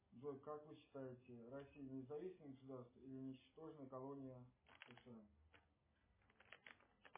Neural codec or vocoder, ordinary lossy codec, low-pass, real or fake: none; MP3, 16 kbps; 3.6 kHz; real